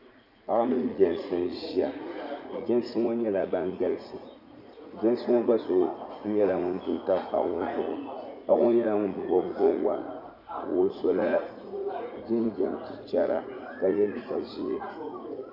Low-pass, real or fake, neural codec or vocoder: 5.4 kHz; fake; vocoder, 44.1 kHz, 80 mel bands, Vocos